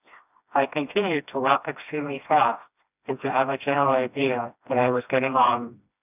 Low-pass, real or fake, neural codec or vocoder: 3.6 kHz; fake; codec, 16 kHz, 1 kbps, FreqCodec, smaller model